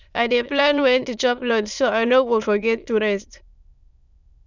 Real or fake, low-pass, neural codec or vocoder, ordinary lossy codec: fake; 7.2 kHz; autoencoder, 22.05 kHz, a latent of 192 numbers a frame, VITS, trained on many speakers; none